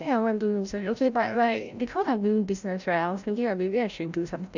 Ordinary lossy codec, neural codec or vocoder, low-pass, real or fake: none; codec, 16 kHz, 0.5 kbps, FreqCodec, larger model; 7.2 kHz; fake